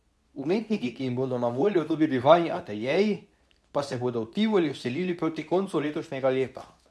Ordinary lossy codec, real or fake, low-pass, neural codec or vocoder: none; fake; none; codec, 24 kHz, 0.9 kbps, WavTokenizer, medium speech release version 2